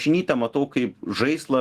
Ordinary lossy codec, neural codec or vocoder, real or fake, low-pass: Opus, 16 kbps; autoencoder, 48 kHz, 128 numbers a frame, DAC-VAE, trained on Japanese speech; fake; 14.4 kHz